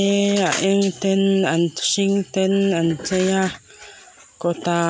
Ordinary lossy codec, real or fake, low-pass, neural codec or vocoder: none; real; none; none